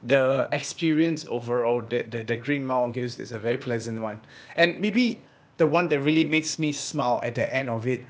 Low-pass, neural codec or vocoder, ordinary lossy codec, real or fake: none; codec, 16 kHz, 0.8 kbps, ZipCodec; none; fake